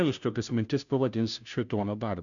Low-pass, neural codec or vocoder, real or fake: 7.2 kHz; codec, 16 kHz, 0.5 kbps, FunCodec, trained on Chinese and English, 25 frames a second; fake